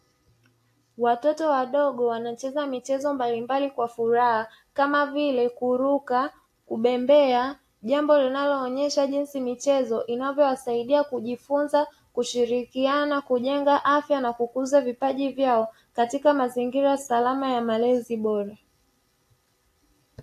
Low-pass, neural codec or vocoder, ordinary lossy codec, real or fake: 14.4 kHz; none; AAC, 64 kbps; real